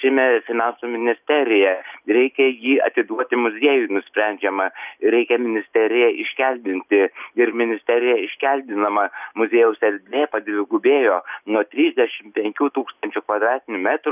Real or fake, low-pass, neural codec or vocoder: real; 3.6 kHz; none